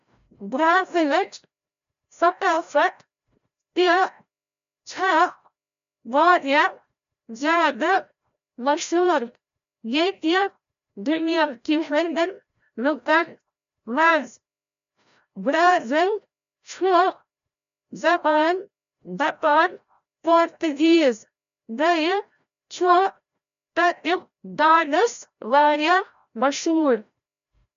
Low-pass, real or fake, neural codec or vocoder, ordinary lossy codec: 7.2 kHz; fake; codec, 16 kHz, 0.5 kbps, FreqCodec, larger model; MP3, 64 kbps